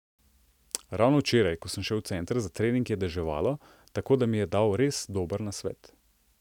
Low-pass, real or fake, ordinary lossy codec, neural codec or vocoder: 19.8 kHz; real; none; none